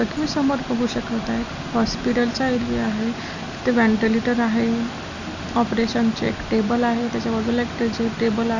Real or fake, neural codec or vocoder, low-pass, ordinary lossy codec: real; none; 7.2 kHz; none